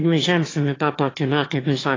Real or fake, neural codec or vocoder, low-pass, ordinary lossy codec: fake; autoencoder, 22.05 kHz, a latent of 192 numbers a frame, VITS, trained on one speaker; 7.2 kHz; AAC, 32 kbps